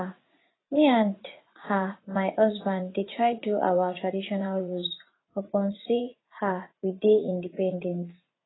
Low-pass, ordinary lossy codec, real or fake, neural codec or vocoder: 7.2 kHz; AAC, 16 kbps; fake; vocoder, 44.1 kHz, 80 mel bands, Vocos